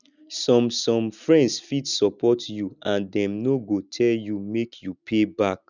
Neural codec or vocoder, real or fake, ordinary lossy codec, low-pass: none; real; none; 7.2 kHz